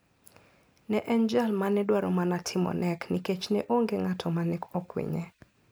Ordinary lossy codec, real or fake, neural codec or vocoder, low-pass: none; real; none; none